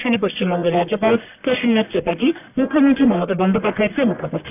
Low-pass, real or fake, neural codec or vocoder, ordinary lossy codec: 3.6 kHz; fake; codec, 44.1 kHz, 1.7 kbps, Pupu-Codec; none